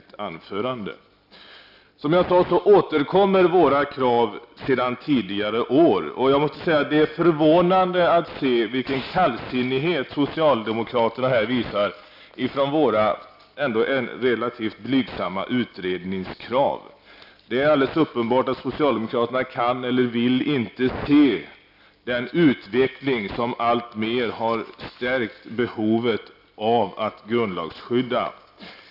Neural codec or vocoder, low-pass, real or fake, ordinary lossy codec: none; 5.4 kHz; real; none